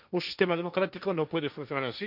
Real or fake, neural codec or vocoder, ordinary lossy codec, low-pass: fake; codec, 16 kHz, 1.1 kbps, Voila-Tokenizer; none; 5.4 kHz